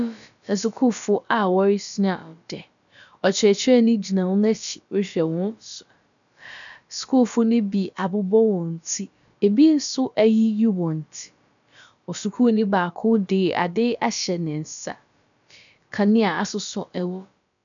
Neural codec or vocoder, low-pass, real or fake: codec, 16 kHz, about 1 kbps, DyCAST, with the encoder's durations; 7.2 kHz; fake